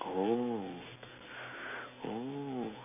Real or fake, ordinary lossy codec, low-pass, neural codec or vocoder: real; none; 3.6 kHz; none